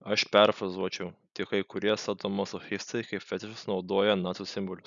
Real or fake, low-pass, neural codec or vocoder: real; 7.2 kHz; none